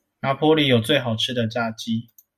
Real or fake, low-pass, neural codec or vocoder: real; 14.4 kHz; none